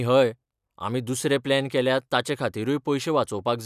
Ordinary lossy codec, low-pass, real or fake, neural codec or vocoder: none; 14.4 kHz; real; none